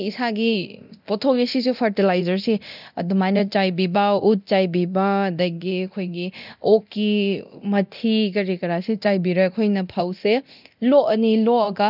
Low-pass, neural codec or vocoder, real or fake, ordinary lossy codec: 5.4 kHz; codec, 24 kHz, 0.9 kbps, DualCodec; fake; none